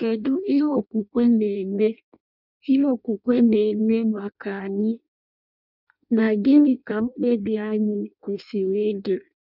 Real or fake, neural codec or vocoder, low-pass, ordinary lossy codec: fake; codec, 16 kHz in and 24 kHz out, 0.6 kbps, FireRedTTS-2 codec; 5.4 kHz; none